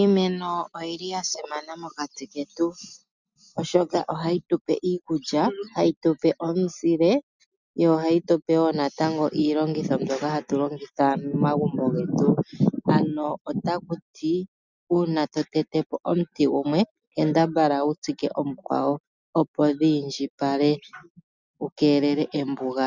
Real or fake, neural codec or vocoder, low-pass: real; none; 7.2 kHz